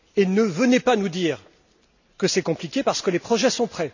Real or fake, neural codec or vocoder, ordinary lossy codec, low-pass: real; none; none; 7.2 kHz